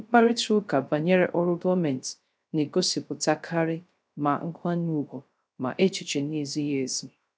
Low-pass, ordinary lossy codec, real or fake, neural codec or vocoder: none; none; fake; codec, 16 kHz, 0.3 kbps, FocalCodec